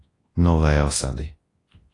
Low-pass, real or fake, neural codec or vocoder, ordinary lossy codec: 10.8 kHz; fake; codec, 24 kHz, 0.9 kbps, WavTokenizer, large speech release; AAC, 32 kbps